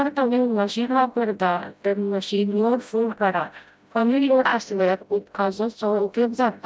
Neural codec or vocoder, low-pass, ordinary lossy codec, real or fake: codec, 16 kHz, 0.5 kbps, FreqCodec, smaller model; none; none; fake